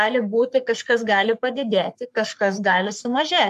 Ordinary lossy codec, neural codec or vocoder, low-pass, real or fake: AAC, 96 kbps; codec, 44.1 kHz, 3.4 kbps, Pupu-Codec; 14.4 kHz; fake